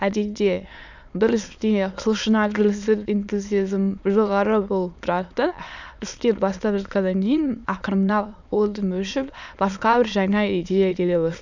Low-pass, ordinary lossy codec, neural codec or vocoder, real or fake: 7.2 kHz; none; autoencoder, 22.05 kHz, a latent of 192 numbers a frame, VITS, trained on many speakers; fake